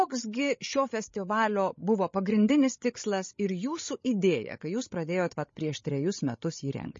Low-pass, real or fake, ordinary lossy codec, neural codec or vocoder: 7.2 kHz; fake; MP3, 32 kbps; codec, 16 kHz, 16 kbps, FreqCodec, larger model